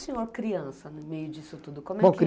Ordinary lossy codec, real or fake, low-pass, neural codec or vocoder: none; real; none; none